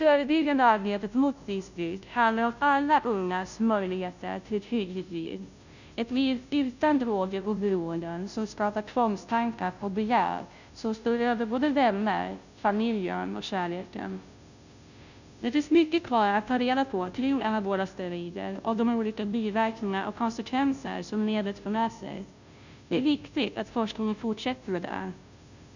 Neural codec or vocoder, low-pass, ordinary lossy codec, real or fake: codec, 16 kHz, 0.5 kbps, FunCodec, trained on Chinese and English, 25 frames a second; 7.2 kHz; none; fake